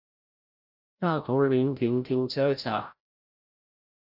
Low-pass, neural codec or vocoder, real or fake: 5.4 kHz; codec, 16 kHz, 0.5 kbps, FreqCodec, larger model; fake